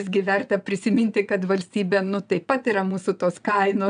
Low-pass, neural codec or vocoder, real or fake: 9.9 kHz; vocoder, 22.05 kHz, 80 mel bands, WaveNeXt; fake